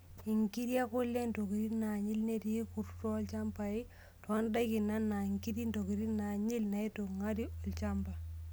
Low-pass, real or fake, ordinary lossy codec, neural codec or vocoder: none; real; none; none